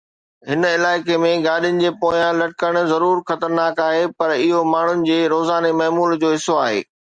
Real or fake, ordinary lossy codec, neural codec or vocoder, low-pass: real; Opus, 64 kbps; none; 9.9 kHz